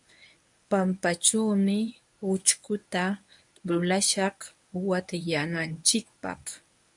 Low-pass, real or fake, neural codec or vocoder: 10.8 kHz; fake; codec, 24 kHz, 0.9 kbps, WavTokenizer, medium speech release version 1